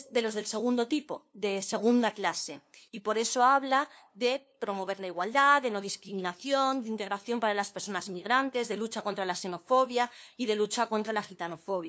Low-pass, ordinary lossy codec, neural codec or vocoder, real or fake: none; none; codec, 16 kHz, 2 kbps, FunCodec, trained on LibriTTS, 25 frames a second; fake